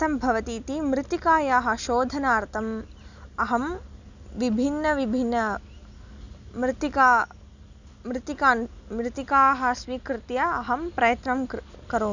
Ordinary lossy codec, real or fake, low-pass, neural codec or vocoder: none; real; 7.2 kHz; none